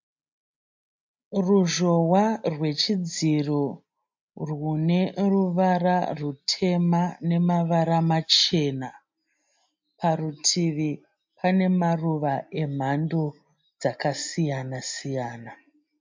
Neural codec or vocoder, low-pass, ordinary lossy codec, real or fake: none; 7.2 kHz; MP3, 48 kbps; real